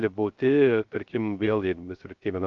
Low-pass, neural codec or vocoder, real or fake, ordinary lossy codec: 7.2 kHz; codec, 16 kHz, 0.3 kbps, FocalCodec; fake; Opus, 24 kbps